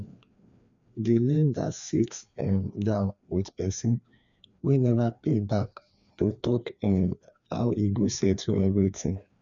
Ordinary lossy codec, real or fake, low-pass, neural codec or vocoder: none; fake; 7.2 kHz; codec, 16 kHz, 2 kbps, FreqCodec, larger model